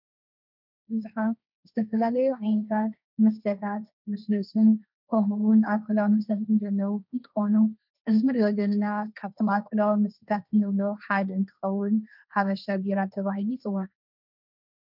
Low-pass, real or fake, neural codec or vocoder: 5.4 kHz; fake; codec, 16 kHz, 1.1 kbps, Voila-Tokenizer